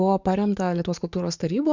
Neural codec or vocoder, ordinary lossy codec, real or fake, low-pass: codec, 44.1 kHz, 7.8 kbps, DAC; Opus, 64 kbps; fake; 7.2 kHz